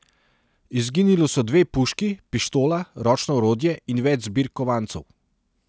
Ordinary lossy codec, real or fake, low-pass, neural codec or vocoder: none; real; none; none